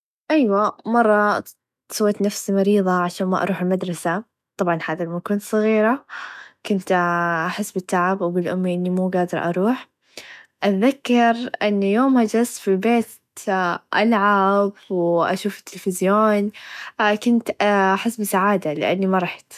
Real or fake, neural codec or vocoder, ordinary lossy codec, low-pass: fake; autoencoder, 48 kHz, 128 numbers a frame, DAC-VAE, trained on Japanese speech; none; 14.4 kHz